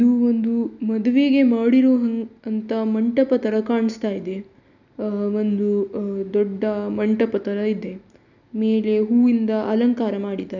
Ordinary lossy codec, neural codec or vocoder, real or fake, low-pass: none; none; real; 7.2 kHz